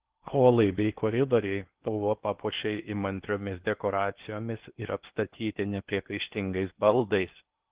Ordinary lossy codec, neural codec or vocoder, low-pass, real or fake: Opus, 16 kbps; codec, 16 kHz in and 24 kHz out, 0.8 kbps, FocalCodec, streaming, 65536 codes; 3.6 kHz; fake